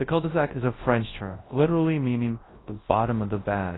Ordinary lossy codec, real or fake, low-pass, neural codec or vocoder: AAC, 16 kbps; fake; 7.2 kHz; codec, 24 kHz, 0.9 kbps, WavTokenizer, large speech release